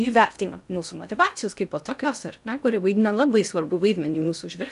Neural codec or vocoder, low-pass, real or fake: codec, 16 kHz in and 24 kHz out, 0.6 kbps, FocalCodec, streaming, 2048 codes; 10.8 kHz; fake